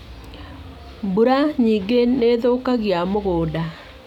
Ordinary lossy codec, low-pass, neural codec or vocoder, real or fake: none; 19.8 kHz; none; real